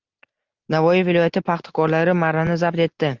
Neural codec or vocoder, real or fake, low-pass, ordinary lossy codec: none; real; 7.2 kHz; Opus, 32 kbps